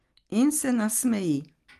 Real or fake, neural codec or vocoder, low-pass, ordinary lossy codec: real; none; 14.4 kHz; Opus, 24 kbps